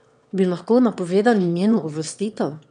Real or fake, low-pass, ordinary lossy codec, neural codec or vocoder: fake; 9.9 kHz; none; autoencoder, 22.05 kHz, a latent of 192 numbers a frame, VITS, trained on one speaker